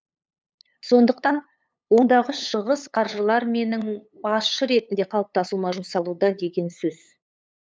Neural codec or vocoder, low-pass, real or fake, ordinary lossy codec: codec, 16 kHz, 8 kbps, FunCodec, trained on LibriTTS, 25 frames a second; none; fake; none